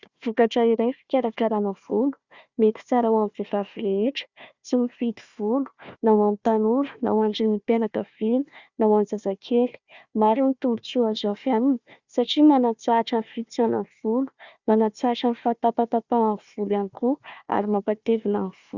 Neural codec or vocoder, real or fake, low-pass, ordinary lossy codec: codec, 16 kHz, 1 kbps, FunCodec, trained on Chinese and English, 50 frames a second; fake; 7.2 kHz; Opus, 64 kbps